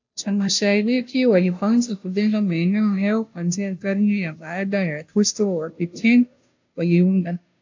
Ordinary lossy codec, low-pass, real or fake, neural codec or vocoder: AAC, 48 kbps; 7.2 kHz; fake; codec, 16 kHz, 0.5 kbps, FunCodec, trained on Chinese and English, 25 frames a second